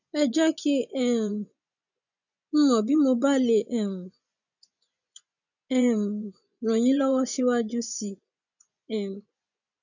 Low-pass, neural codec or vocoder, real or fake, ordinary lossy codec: 7.2 kHz; vocoder, 24 kHz, 100 mel bands, Vocos; fake; none